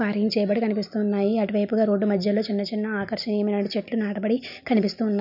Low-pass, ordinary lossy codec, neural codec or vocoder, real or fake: 5.4 kHz; none; none; real